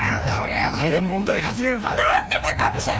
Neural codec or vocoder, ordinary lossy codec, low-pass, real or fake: codec, 16 kHz, 1 kbps, FreqCodec, larger model; none; none; fake